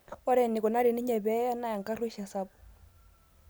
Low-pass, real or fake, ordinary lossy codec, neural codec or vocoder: none; real; none; none